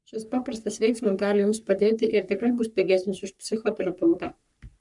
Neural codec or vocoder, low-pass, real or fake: codec, 44.1 kHz, 3.4 kbps, Pupu-Codec; 10.8 kHz; fake